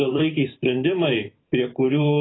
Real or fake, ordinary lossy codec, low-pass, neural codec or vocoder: fake; AAC, 16 kbps; 7.2 kHz; vocoder, 44.1 kHz, 128 mel bands every 512 samples, BigVGAN v2